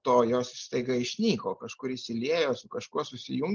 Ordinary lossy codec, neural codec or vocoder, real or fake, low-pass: Opus, 24 kbps; none; real; 7.2 kHz